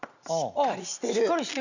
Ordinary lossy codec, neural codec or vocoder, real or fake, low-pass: none; none; real; 7.2 kHz